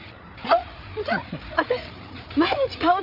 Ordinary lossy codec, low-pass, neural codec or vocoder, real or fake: none; 5.4 kHz; codec, 16 kHz, 8 kbps, FreqCodec, larger model; fake